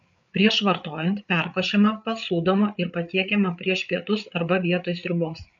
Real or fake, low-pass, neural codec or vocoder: fake; 7.2 kHz; codec, 16 kHz, 8 kbps, FreqCodec, larger model